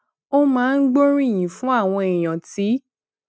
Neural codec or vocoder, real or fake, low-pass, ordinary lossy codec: none; real; none; none